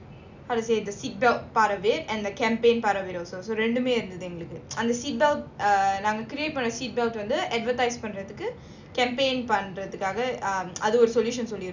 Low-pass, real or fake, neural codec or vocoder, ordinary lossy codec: 7.2 kHz; real; none; none